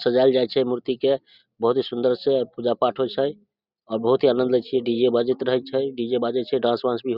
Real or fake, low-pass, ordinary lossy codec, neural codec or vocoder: real; 5.4 kHz; Opus, 64 kbps; none